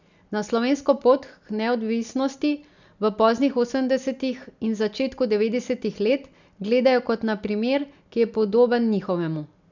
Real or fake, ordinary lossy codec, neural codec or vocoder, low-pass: real; none; none; 7.2 kHz